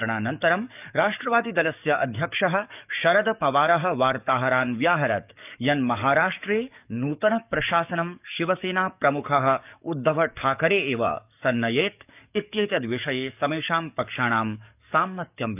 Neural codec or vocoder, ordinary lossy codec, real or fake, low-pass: codec, 44.1 kHz, 7.8 kbps, DAC; none; fake; 3.6 kHz